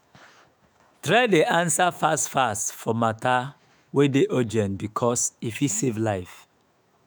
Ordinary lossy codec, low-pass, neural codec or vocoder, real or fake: none; none; autoencoder, 48 kHz, 128 numbers a frame, DAC-VAE, trained on Japanese speech; fake